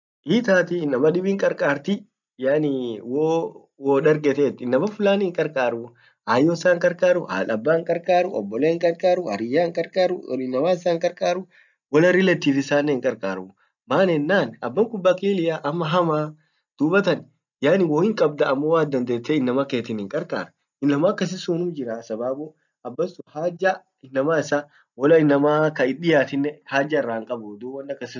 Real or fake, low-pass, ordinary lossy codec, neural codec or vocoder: real; 7.2 kHz; none; none